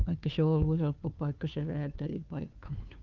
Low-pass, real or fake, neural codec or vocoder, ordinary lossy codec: 7.2 kHz; fake; codec, 16 kHz, 2 kbps, FunCodec, trained on Chinese and English, 25 frames a second; Opus, 24 kbps